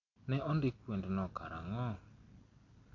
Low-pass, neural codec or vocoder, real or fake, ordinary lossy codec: 7.2 kHz; none; real; none